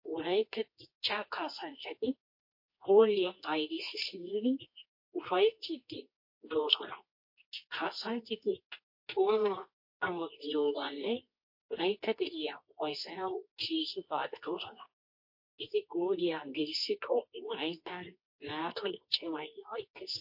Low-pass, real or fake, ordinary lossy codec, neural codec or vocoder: 5.4 kHz; fake; MP3, 32 kbps; codec, 24 kHz, 0.9 kbps, WavTokenizer, medium music audio release